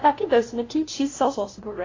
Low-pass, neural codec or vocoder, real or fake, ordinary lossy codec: 7.2 kHz; codec, 16 kHz, 0.5 kbps, FunCodec, trained on LibriTTS, 25 frames a second; fake; AAC, 32 kbps